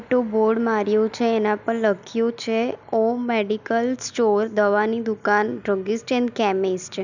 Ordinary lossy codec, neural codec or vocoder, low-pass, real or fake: none; none; 7.2 kHz; real